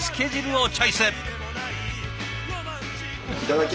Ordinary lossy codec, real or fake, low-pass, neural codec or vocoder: none; real; none; none